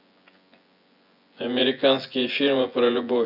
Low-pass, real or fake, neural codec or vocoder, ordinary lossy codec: 5.4 kHz; fake; vocoder, 24 kHz, 100 mel bands, Vocos; none